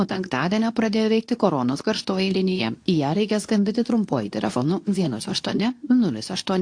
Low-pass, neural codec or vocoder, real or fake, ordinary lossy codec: 9.9 kHz; codec, 24 kHz, 0.9 kbps, WavTokenizer, medium speech release version 2; fake; AAC, 48 kbps